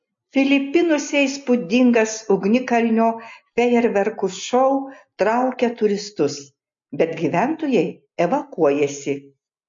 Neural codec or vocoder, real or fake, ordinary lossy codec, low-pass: none; real; MP3, 48 kbps; 7.2 kHz